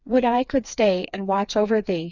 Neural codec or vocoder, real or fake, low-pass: codec, 16 kHz, 4 kbps, FreqCodec, smaller model; fake; 7.2 kHz